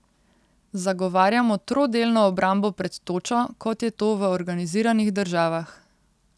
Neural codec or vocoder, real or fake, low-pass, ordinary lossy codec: none; real; none; none